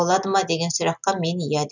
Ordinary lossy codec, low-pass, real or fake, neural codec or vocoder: none; 7.2 kHz; real; none